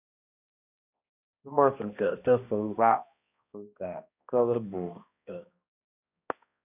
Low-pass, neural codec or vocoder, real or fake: 3.6 kHz; codec, 16 kHz, 1 kbps, X-Codec, HuBERT features, trained on balanced general audio; fake